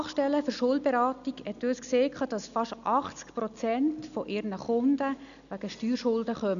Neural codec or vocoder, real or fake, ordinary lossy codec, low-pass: none; real; none; 7.2 kHz